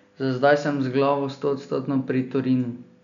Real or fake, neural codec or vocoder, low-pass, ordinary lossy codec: real; none; 7.2 kHz; none